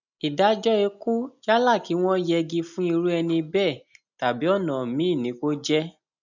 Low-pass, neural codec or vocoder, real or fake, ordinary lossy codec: 7.2 kHz; none; real; none